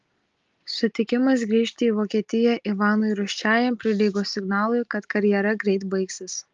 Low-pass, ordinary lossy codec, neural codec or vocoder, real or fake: 7.2 kHz; Opus, 32 kbps; none; real